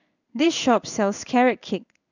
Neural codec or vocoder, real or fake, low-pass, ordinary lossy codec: codec, 16 kHz in and 24 kHz out, 1 kbps, XY-Tokenizer; fake; 7.2 kHz; none